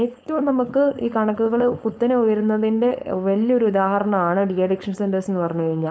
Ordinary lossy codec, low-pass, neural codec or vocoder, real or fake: none; none; codec, 16 kHz, 4.8 kbps, FACodec; fake